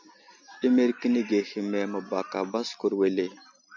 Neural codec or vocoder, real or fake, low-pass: none; real; 7.2 kHz